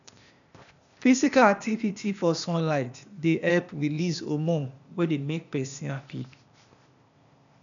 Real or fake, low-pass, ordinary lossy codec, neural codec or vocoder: fake; 7.2 kHz; none; codec, 16 kHz, 0.8 kbps, ZipCodec